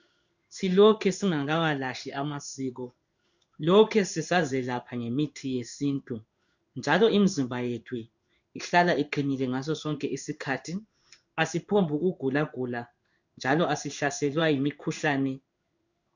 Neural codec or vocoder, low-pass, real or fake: codec, 16 kHz in and 24 kHz out, 1 kbps, XY-Tokenizer; 7.2 kHz; fake